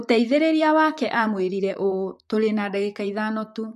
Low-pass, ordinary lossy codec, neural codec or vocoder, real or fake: 14.4 kHz; MP3, 64 kbps; vocoder, 44.1 kHz, 128 mel bands, Pupu-Vocoder; fake